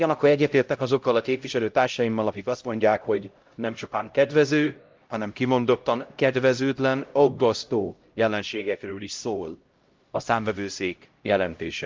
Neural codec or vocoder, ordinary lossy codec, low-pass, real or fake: codec, 16 kHz, 0.5 kbps, X-Codec, HuBERT features, trained on LibriSpeech; Opus, 32 kbps; 7.2 kHz; fake